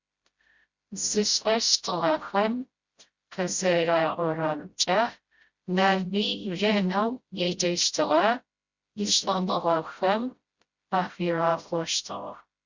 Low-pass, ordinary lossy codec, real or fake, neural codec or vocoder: 7.2 kHz; Opus, 64 kbps; fake; codec, 16 kHz, 0.5 kbps, FreqCodec, smaller model